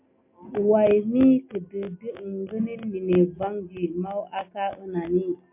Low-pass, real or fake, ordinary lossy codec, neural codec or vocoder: 3.6 kHz; real; MP3, 32 kbps; none